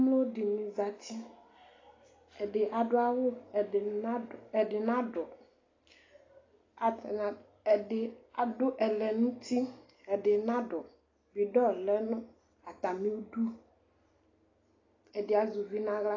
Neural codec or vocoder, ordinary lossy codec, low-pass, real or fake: none; AAC, 32 kbps; 7.2 kHz; real